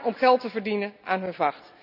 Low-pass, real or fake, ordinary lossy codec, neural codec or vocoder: 5.4 kHz; real; none; none